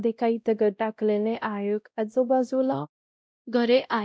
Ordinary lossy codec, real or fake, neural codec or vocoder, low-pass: none; fake; codec, 16 kHz, 0.5 kbps, X-Codec, WavLM features, trained on Multilingual LibriSpeech; none